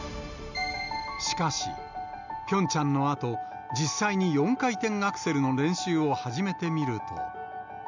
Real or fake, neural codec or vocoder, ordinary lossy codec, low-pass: real; none; none; 7.2 kHz